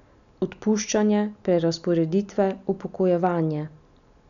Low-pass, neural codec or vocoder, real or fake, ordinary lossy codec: 7.2 kHz; none; real; none